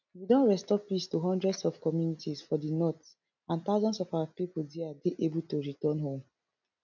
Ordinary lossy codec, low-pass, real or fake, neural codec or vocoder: none; 7.2 kHz; real; none